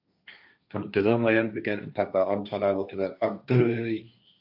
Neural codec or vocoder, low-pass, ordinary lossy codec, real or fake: codec, 16 kHz, 1.1 kbps, Voila-Tokenizer; 5.4 kHz; AAC, 48 kbps; fake